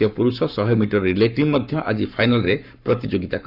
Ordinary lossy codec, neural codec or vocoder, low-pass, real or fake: none; codec, 44.1 kHz, 7.8 kbps, Pupu-Codec; 5.4 kHz; fake